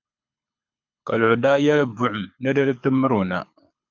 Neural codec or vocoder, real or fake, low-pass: codec, 24 kHz, 6 kbps, HILCodec; fake; 7.2 kHz